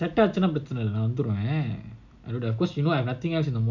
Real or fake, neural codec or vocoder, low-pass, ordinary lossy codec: real; none; 7.2 kHz; none